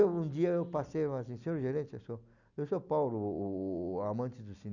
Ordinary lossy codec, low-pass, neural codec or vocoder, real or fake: none; 7.2 kHz; none; real